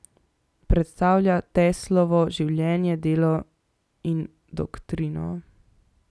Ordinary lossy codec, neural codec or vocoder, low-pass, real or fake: none; none; none; real